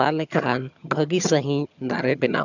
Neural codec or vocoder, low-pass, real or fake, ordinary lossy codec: vocoder, 22.05 kHz, 80 mel bands, HiFi-GAN; 7.2 kHz; fake; none